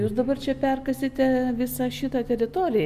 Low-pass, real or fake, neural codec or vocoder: 14.4 kHz; real; none